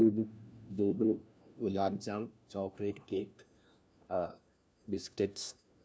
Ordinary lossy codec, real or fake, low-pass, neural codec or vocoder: none; fake; none; codec, 16 kHz, 1 kbps, FunCodec, trained on LibriTTS, 50 frames a second